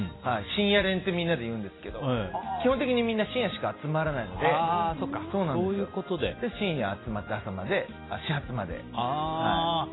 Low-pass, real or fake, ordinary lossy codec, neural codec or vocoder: 7.2 kHz; real; AAC, 16 kbps; none